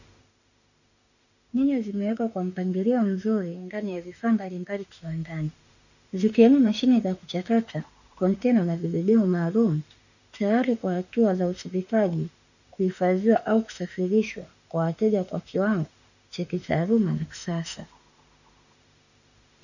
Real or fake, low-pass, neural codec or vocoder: fake; 7.2 kHz; autoencoder, 48 kHz, 32 numbers a frame, DAC-VAE, trained on Japanese speech